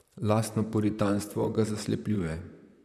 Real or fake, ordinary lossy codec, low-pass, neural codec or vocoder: fake; none; 14.4 kHz; vocoder, 44.1 kHz, 128 mel bands, Pupu-Vocoder